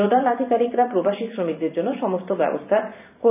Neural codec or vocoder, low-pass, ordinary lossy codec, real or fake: vocoder, 44.1 kHz, 128 mel bands every 512 samples, BigVGAN v2; 3.6 kHz; none; fake